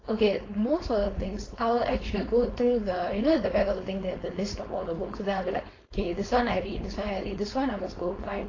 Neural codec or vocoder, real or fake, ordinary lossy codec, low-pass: codec, 16 kHz, 4.8 kbps, FACodec; fake; AAC, 32 kbps; 7.2 kHz